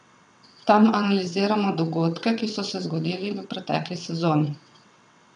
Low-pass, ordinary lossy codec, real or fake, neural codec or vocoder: 9.9 kHz; none; fake; vocoder, 22.05 kHz, 80 mel bands, WaveNeXt